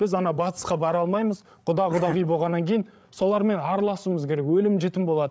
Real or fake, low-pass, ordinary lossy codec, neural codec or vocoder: fake; none; none; codec, 16 kHz, 8 kbps, FreqCodec, larger model